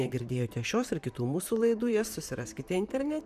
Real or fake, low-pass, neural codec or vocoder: fake; 14.4 kHz; vocoder, 44.1 kHz, 128 mel bands, Pupu-Vocoder